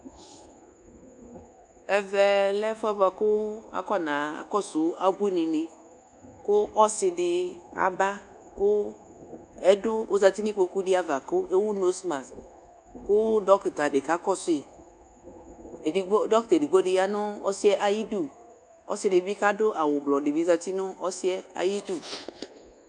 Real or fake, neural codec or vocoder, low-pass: fake; codec, 24 kHz, 1.2 kbps, DualCodec; 10.8 kHz